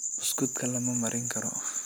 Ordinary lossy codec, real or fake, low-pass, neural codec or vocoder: none; real; none; none